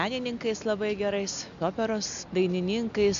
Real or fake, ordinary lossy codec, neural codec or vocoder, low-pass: real; MP3, 64 kbps; none; 7.2 kHz